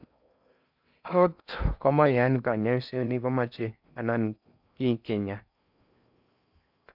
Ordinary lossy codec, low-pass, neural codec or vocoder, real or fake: none; 5.4 kHz; codec, 16 kHz in and 24 kHz out, 0.6 kbps, FocalCodec, streaming, 4096 codes; fake